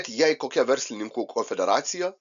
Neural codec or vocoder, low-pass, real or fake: none; 7.2 kHz; real